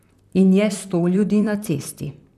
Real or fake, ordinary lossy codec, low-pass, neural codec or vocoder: fake; none; 14.4 kHz; vocoder, 44.1 kHz, 128 mel bands, Pupu-Vocoder